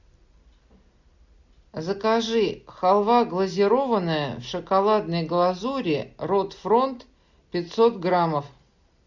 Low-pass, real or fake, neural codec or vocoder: 7.2 kHz; real; none